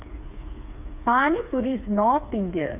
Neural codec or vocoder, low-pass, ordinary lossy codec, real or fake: codec, 16 kHz, 4 kbps, FreqCodec, smaller model; 3.6 kHz; none; fake